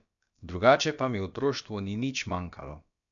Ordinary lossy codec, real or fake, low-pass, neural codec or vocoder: none; fake; 7.2 kHz; codec, 16 kHz, about 1 kbps, DyCAST, with the encoder's durations